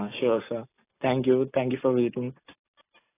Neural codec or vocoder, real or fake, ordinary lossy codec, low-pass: none; real; AAC, 16 kbps; 3.6 kHz